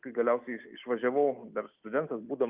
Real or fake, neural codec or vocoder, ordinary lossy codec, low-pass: real; none; Opus, 24 kbps; 3.6 kHz